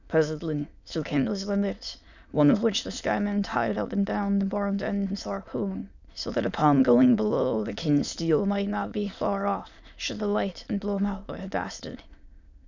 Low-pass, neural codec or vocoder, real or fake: 7.2 kHz; autoencoder, 22.05 kHz, a latent of 192 numbers a frame, VITS, trained on many speakers; fake